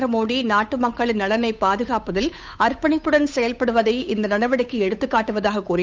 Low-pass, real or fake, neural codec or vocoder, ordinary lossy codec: none; fake; codec, 16 kHz, 8 kbps, FunCodec, trained on Chinese and English, 25 frames a second; none